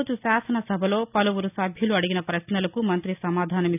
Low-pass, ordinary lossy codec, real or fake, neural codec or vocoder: 3.6 kHz; none; real; none